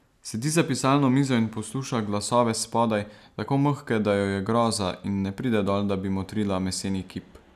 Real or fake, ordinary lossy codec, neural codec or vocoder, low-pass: real; none; none; 14.4 kHz